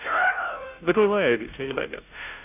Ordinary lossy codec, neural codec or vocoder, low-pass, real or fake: none; codec, 16 kHz, 0.5 kbps, FunCodec, trained on Chinese and English, 25 frames a second; 3.6 kHz; fake